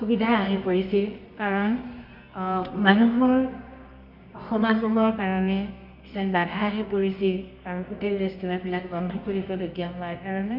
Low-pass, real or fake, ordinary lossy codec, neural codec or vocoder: 5.4 kHz; fake; none; codec, 24 kHz, 0.9 kbps, WavTokenizer, medium music audio release